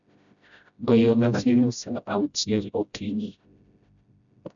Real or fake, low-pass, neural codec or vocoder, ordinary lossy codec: fake; 7.2 kHz; codec, 16 kHz, 0.5 kbps, FreqCodec, smaller model; none